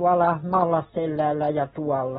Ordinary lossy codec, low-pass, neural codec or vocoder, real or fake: AAC, 16 kbps; 19.8 kHz; none; real